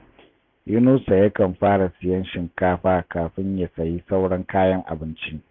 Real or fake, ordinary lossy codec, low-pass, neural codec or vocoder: real; MP3, 64 kbps; 7.2 kHz; none